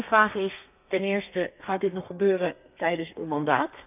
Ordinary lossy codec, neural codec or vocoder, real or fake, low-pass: none; codec, 32 kHz, 1.9 kbps, SNAC; fake; 3.6 kHz